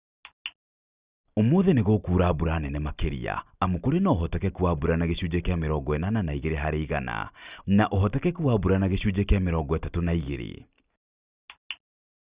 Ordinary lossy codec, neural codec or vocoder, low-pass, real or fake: Opus, 64 kbps; none; 3.6 kHz; real